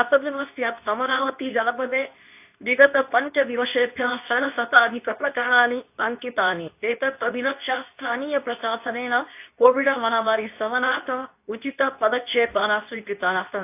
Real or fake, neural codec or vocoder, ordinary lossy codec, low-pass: fake; codec, 24 kHz, 0.9 kbps, WavTokenizer, medium speech release version 2; AAC, 32 kbps; 3.6 kHz